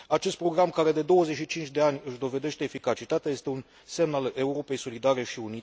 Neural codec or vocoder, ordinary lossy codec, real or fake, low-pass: none; none; real; none